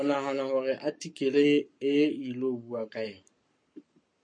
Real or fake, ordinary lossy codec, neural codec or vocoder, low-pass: fake; MP3, 48 kbps; codec, 44.1 kHz, 7.8 kbps, Pupu-Codec; 9.9 kHz